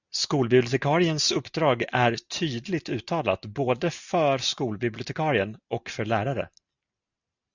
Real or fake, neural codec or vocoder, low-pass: real; none; 7.2 kHz